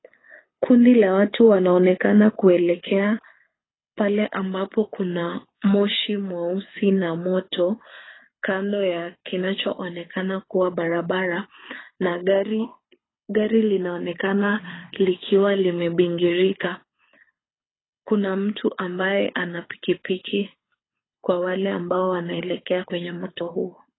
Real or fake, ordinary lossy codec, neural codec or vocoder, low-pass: fake; AAC, 16 kbps; codec, 24 kHz, 6 kbps, HILCodec; 7.2 kHz